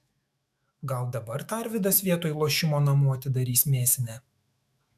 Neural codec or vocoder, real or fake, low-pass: autoencoder, 48 kHz, 128 numbers a frame, DAC-VAE, trained on Japanese speech; fake; 14.4 kHz